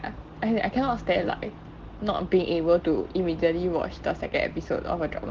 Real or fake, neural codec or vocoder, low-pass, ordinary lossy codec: real; none; 7.2 kHz; Opus, 16 kbps